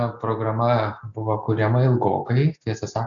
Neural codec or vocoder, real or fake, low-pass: none; real; 7.2 kHz